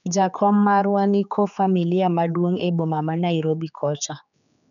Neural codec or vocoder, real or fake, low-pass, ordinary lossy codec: codec, 16 kHz, 4 kbps, X-Codec, HuBERT features, trained on general audio; fake; 7.2 kHz; none